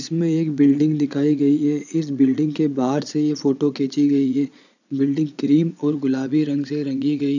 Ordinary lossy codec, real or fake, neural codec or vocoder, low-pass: none; fake; vocoder, 22.05 kHz, 80 mel bands, Vocos; 7.2 kHz